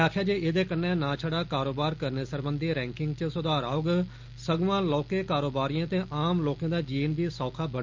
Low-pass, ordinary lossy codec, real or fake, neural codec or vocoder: 7.2 kHz; Opus, 32 kbps; real; none